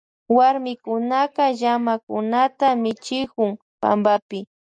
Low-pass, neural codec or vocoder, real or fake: 9.9 kHz; none; real